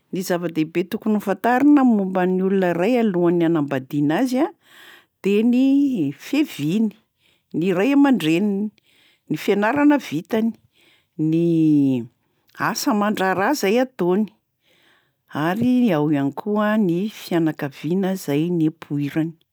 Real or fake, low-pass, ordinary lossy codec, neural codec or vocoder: real; none; none; none